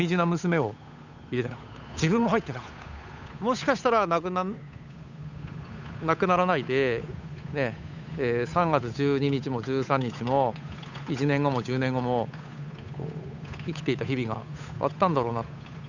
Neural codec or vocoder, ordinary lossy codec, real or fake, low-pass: codec, 16 kHz, 8 kbps, FunCodec, trained on Chinese and English, 25 frames a second; none; fake; 7.2 kHz